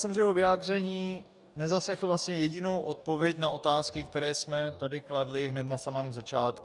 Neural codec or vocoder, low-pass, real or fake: codec, 44.1 kHz, 2.6 kbps, DAC; 10.8 kHz; fake